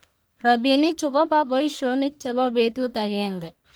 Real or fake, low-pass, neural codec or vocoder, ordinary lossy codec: fake; none; codec, 44.1 kHz, 1.7 kbps, Pupu-Codec; none